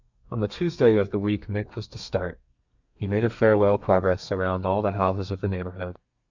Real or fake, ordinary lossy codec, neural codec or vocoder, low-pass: fake; Opus, 64 kbps; codec, 32 kHz, 1.9 kbps, SNAC; 7.2 kHz